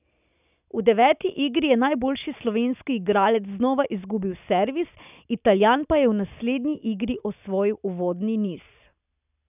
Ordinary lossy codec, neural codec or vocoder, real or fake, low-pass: none; none; real; 3.6 kHz